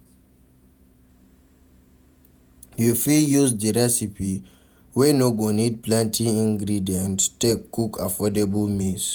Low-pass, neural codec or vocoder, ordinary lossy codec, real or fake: none; vocoder, 48 kHz, 128 mel bands, Vocos; none; fake